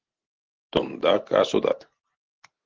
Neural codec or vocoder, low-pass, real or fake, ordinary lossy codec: none; 7.2 kHz; real; Opus, 16 kbps